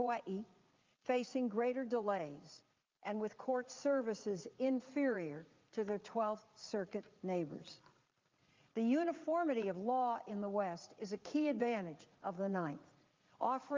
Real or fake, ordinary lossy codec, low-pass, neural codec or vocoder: fake; Opus, 24 kbps; 7.2 kHz; vocoder, 44.1 kHz, 80 mel bands, Vocos